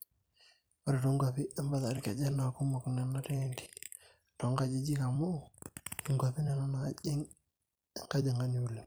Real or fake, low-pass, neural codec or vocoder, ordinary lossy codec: fake; none; vocoder, 44.1 kHz, 128 mel bands every 256 samples, BigVGAN v2; none